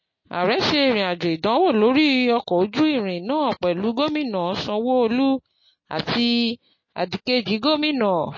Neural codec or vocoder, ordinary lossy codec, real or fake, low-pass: none; MP3, 32 kbps; real; 7.2 kHz